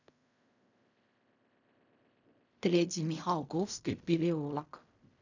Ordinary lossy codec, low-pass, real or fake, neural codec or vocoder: none; 7.2 kHz; fake; codec, 16 kHz in and 24 kHz out, 0.4 kbps, LongCat-Audio-Codec, fine tuned four codebook decoder